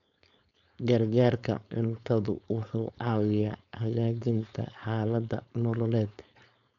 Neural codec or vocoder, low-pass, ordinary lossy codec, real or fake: codec, 16 kHz, 4.8 kbps, FACodec; 7.2 kHz; none; fake